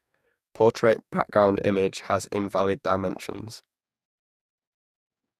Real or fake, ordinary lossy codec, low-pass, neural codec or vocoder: fake; none; 14.4 kHz; codec, 44.1 kHz, 2.6 kbps, DAC